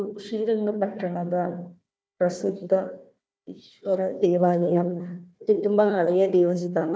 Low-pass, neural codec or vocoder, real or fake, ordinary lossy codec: none; codec, 16 kHz, 1 kbps, FunCodec, trained on Chinese and English, 50 frames a second; fake; none